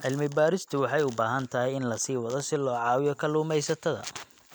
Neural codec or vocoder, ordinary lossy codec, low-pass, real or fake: none; none; none; real